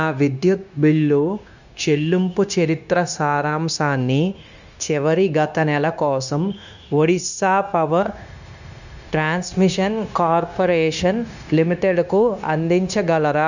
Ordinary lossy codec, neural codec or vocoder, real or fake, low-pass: none; codec, 16 kHz, 0.9 kbps, LongCat-Audio-Codec; fake; 7.2 kHz